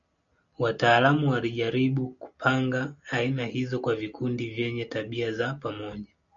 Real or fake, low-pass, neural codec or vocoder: real; 7.2 kHz; none